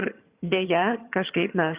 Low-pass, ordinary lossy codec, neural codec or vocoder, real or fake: 3.6 kHz; Opus, 64 kbps; vocoder, 22.05 kHz, 80 mel bands, HiFi-GAN; fake